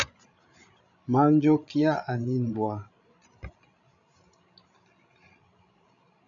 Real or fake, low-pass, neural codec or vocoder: fake; 7.2 kHz; codec, 16 kHz, 16 kbps, FreqCodec, larger model